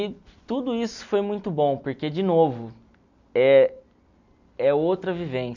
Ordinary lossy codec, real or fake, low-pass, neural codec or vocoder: none; real; 7.2 kHz; none